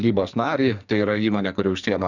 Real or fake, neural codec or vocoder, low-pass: fake; codec, 44.1 kHz, 2.6 kbps, SNAC; 7.2 kHz